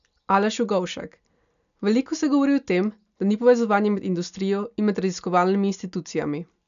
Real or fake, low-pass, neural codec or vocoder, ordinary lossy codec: real; 7.2 kHz; none; none